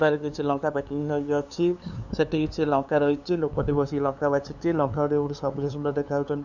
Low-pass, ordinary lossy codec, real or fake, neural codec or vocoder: 7.2 kHz; none; fake; codec, 16 kHz, 2 kbps, FunCodec, trained on LibriTTS, 25 frames a second